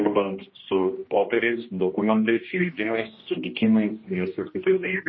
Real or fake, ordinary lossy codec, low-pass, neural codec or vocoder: fake; MP3, 24 kbps; 7.2 kHz; codec, 16 kHz, 1 kbps, X-Codec, HuBERT features, trained on general audio